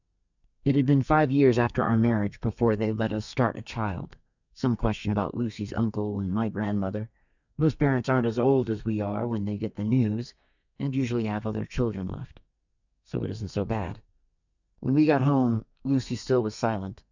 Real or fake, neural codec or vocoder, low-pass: fake; codec, 32 kHz, 1.9 kbps, SNAC; 7.2 kHz